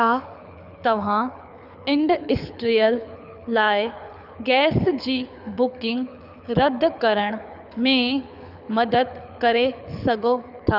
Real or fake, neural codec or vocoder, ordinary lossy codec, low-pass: fake; codec, 24 kHz, 6 kbps, HILCodec; none; 5.4 kHz